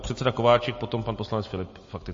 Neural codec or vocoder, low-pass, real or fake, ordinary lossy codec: none; 7.2 kHz; real; MP3, 32 kbps